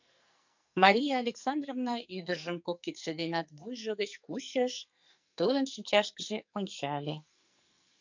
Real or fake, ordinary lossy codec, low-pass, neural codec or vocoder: fake; MP3, 64 kbps; 7.2 kHz; codec, 44.1 kHz, 2.6 kbps, SNAC